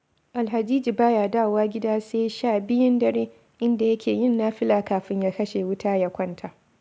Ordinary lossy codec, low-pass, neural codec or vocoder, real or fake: none; none; none; real